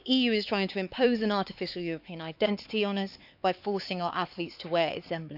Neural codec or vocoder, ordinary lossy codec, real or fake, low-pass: codec, 16 kHz, 4 kbps, X-Codec, HuBERT features, trained on LibriSpeech; none; fake; 5.4 kHz